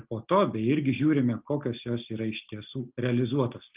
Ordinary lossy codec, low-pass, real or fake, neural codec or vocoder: Opus, 24 kbps; 3.6 kHz; real; none